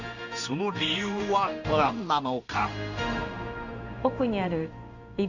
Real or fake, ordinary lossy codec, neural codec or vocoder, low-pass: fake; none; codec, 16 kHz in and 24 kHz out, 1 kbps, XY-Tokenizer; 7.2 kHz